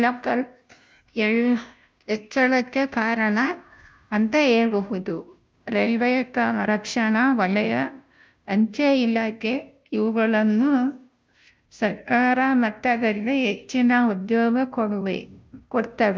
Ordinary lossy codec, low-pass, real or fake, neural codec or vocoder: none; none; fake; codec, 16 kHz, 0.5 kbps, FunCodec, trained on Chinese and English, 25 frames a second